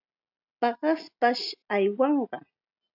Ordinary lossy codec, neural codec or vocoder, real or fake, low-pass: AAC, 48 kbps; none; real; 5.4 kHz